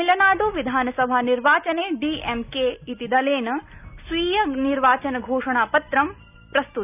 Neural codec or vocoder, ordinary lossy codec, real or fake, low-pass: none; none; real; 3.6 kHz